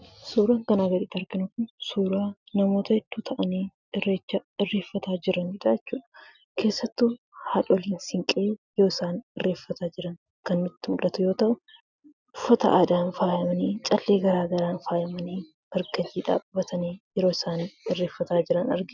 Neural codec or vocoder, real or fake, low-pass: none; real; 7.2 kHz